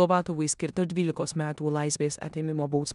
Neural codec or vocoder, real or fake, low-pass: codec, 16 kHz in and 24 kHz out, 0.9 kbps, LongCat-Audio-Codec, four codebook decoder; fake; 10.8 kHz